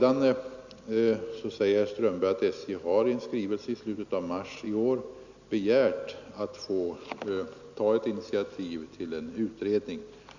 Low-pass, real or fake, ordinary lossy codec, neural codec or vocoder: 7.2 kHz; real; none; none